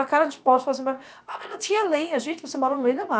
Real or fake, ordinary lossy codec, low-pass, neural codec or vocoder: fake; none; none; codec, 16 kHz, about 1 kbps, DyCAST, with the encoder's durations